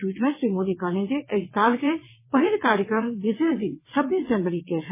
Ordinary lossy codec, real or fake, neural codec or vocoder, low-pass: MP3, 16 kbps; fake; vocoder, 22.05 kHz, 80 mel bands, WaveNeXt; 3.6 kHz